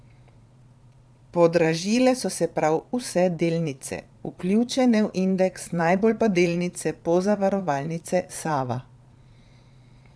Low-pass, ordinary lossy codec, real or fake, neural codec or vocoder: none; none; fake; vocoder, 22.05 kHz, 80 mel bands, Vocos